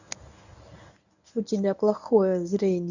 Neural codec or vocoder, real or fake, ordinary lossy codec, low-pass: codec, 24 kHz, 0.9 kbps, WavTokenizer, medium speech release version 1; fake; none; 7.2 kHz